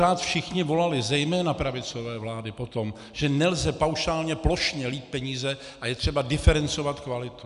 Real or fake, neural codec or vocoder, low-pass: real; none; 10.8 kHz